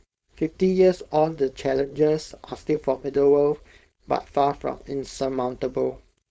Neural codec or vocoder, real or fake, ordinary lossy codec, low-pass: codec, 16 kHz, 4.8 kbps, FACodec; fake; none; none